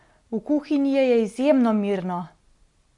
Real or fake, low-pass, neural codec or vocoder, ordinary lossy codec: real; 10.8 kHz; none; none